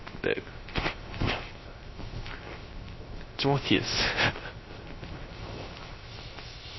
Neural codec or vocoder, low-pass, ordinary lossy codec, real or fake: codec, 16 kHz, 0.3 kbps, FocalCodec; 7.2 kHz; MP3, 24 kbps; fake